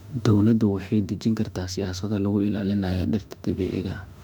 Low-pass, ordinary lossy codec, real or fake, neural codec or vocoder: 19.8 kHz; none; fake; autoencoder, 48 kHz, 32 numbers a frame, DAC-VAE, trained on Japanese speech